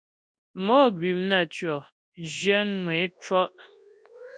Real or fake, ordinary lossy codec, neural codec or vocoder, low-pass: fake; MP3, 64 kbps; codec, 24 kHz, 0.9 kbps, WavTokenizer, large speech release; 9.9 kHz